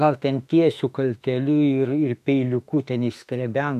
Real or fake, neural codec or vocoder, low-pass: fake; autoencoder, 48 kHz, 32 numbers a frame, DAC-VAE, trained on Japanese speech; 14.4 kHz